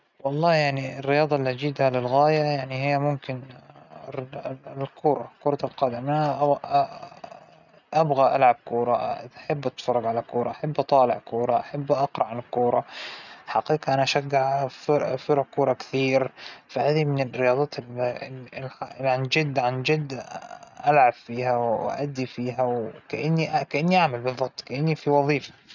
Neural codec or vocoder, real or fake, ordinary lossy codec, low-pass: none; real; none; 7.2 kHz